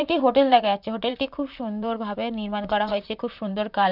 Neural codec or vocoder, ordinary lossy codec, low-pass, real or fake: vocoder, 44.1 kHz, 128 mel bands, Pupu-Vocoder; none; 5.4 kHz; fake